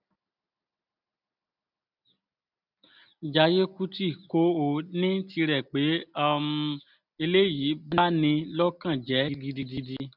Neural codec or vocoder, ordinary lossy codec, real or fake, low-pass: none; none; real; 5.4 kHz